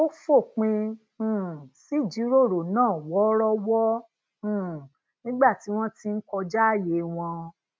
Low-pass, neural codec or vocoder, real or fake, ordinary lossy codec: none; none; real; none